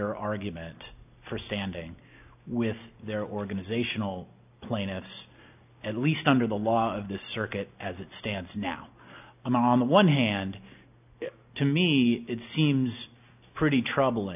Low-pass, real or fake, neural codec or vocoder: 3.6 kHz; real; none